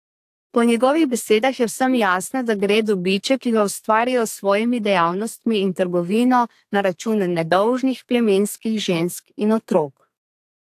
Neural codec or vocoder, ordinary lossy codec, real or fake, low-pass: codec, 32 kHz, 1.9 kbps, SNAC; AAC, 64 kbps; fake; 14.4 kHz